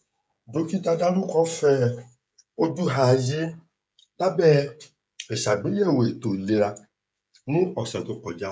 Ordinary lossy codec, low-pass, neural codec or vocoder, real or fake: none; none; codec, 16 kHz, 16 kbps, FreqCodec, smaller model; fake